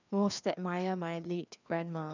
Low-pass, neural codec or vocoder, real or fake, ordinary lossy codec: 7.2 kHz; codec, 16 kHz, 2 kbps, FreqCodec, larger model; fake; none